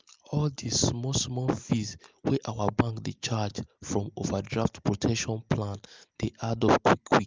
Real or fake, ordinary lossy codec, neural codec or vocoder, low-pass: real; Opus, 24 kbps; none; 7.2 kHz